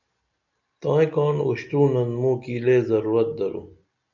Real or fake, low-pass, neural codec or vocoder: real; 7.2 kHz; none